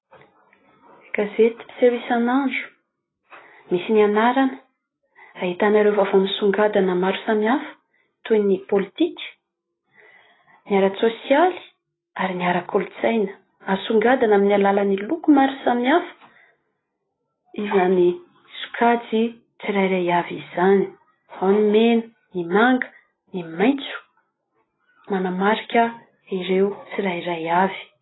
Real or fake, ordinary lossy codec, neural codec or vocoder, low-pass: real; AAC, 16 kbps; none; 7.2 kHz